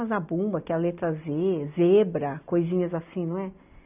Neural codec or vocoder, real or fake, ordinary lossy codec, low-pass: none; real; none; 3.6 kHz